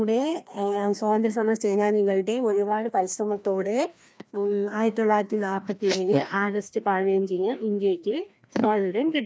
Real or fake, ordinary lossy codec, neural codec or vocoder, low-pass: fake; none; codec, 16 kHz, 1 kbps, FreqCodec, larger model; none